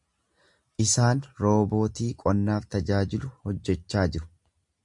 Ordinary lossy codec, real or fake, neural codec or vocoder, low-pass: MP3, 64 kbps; real; none; 10.8 kHz